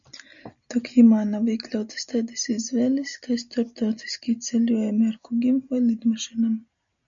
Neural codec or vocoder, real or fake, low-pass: none; real; 7.2 kHz